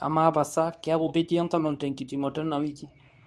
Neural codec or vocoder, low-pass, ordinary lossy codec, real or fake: codec, 24 kHz, 0.9 kbps, WavTokenizer, medium speech release version 2; none; none; fake